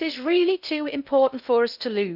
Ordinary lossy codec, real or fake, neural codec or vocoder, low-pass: none; fake; codec, 16 kHz in and 24 kHz out, 0.6 kbps, FocalCodec, streaming, 2048 codes; 5.4 kHz